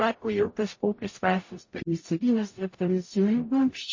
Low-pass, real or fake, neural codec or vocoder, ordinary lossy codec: 7.2 kHz; fake; codec, 44.1 kHz, 0.9 kbps, DAC; MP3, 32 kbps